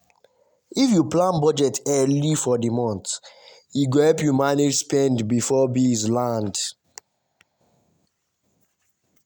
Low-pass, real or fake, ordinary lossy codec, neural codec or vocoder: none; real; none; none